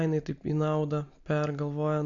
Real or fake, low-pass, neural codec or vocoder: real; 7.2 kHz; none